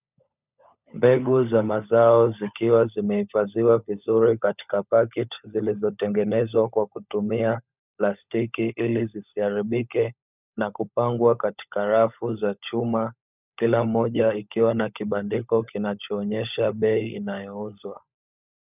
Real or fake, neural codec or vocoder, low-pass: fake; codec, 16 kHz, 16 kbps, FunCodec, trained on LibriTTS, 50 frames a second; 3.6 kHz